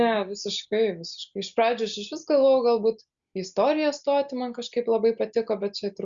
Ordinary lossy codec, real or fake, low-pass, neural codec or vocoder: Opus, 64 kbps; real; 7.2 kHz; none